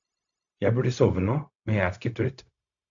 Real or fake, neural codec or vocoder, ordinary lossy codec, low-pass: fake; codec, 16 kHz, 0.4 kbps, LongCat-Audio-Codec; AAC, 64 kbps; 7.2 kHz